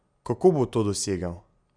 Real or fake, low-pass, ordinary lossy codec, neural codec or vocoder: real; 9.9 kHz; Opus, 64 kbps; none